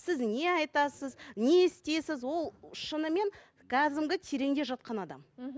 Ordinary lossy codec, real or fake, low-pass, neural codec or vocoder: none; real; none; none